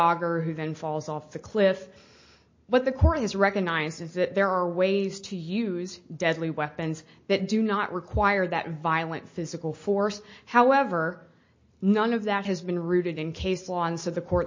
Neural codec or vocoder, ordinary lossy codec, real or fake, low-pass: none; MP3, 32 kbps; real; 7.2 kHz